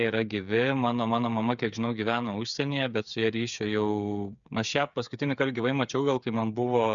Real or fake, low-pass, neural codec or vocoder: fake; 7.2 kHz; codec, 16 kHz, 8 kbps, FreqCodec, smaller model